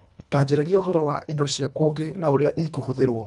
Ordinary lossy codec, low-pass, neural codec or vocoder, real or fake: none; 10.8 kHz; codec, 24 kHz, 1.5 kbps, HILCodec; fake